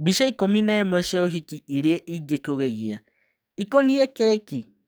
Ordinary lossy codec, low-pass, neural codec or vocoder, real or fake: none; none; codec, 44.1 kHz, 2.6 kbps, SNAC; fake